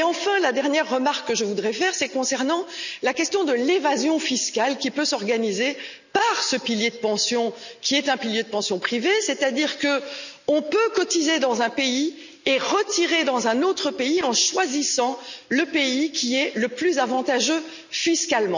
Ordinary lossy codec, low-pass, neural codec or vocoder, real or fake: none; 7.2 kHz; none; real